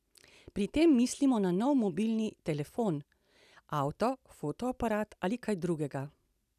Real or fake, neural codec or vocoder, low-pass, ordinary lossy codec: real; none; 14.4 kHz; none